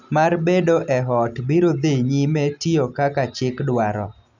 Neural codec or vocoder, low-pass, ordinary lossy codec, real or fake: none; 7.2 kHz; none; real